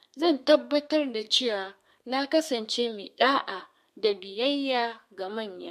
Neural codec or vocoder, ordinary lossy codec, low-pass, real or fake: codec, 32 kHz, 1.9 kbps, SNAC; MP3, 64 kbps; 14.4 kHz; fake